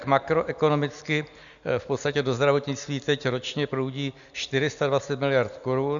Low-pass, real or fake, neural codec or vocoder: 7.2 kHz; real; none